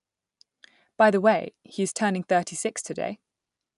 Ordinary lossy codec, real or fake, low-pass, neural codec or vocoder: none; real; 10.8 kHz; none